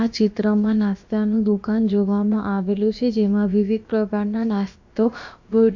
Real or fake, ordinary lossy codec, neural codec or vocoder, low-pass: fake; MP3, 48 kbps; codec, 16 kHz, about 1 kbps, DyCAST, with the encoder's durations; 7.2 kHz